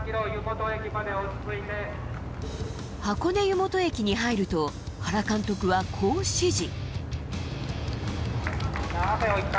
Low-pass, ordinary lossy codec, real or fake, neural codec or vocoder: none; none; real; none